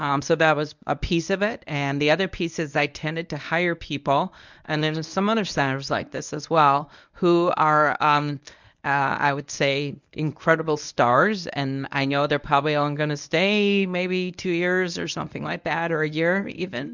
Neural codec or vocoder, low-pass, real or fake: codec, 24 kHz, 0.9 kbps, WavTokenizer, medium speech release version 1; 7.2 kHz; fake